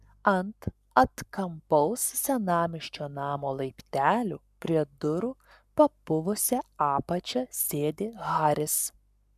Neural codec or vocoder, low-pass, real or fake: codec, 44.1 kHz, 7.8 kbps, Pupu-Codec; 14.4 kHz; fake